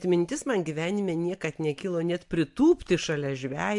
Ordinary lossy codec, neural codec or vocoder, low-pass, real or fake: MP3, 64 kbps; none; 10.8 kHz; real